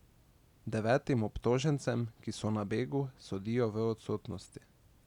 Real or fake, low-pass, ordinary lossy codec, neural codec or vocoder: real; 19.8 kHz; none; none